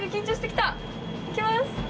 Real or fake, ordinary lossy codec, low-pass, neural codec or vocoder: real; none; none; none